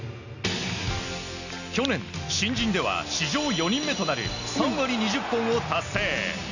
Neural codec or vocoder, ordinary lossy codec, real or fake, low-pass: none; none; real; 7.2 kHz